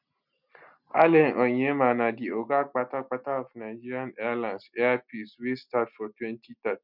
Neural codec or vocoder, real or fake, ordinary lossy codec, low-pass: none; real; none; 5.4 kHz